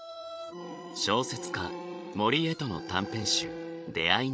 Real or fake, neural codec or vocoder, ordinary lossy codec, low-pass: fake; codec, 16 kHz, 16 kbps, FreqCodec, larger model; none; none